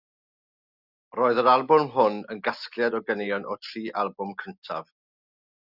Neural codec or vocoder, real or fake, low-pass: none; real; 5.4 kHz